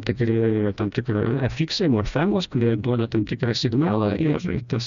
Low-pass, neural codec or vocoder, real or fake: 7.2 kHz; codec, 16 kHz, 1 kbps, FreqCodec, smaller model; fake